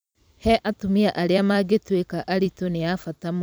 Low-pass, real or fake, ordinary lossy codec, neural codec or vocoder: none; fake; none; vocoder, 44.1 kHz, 128 mel bands every 256 samples, BigVGAN v2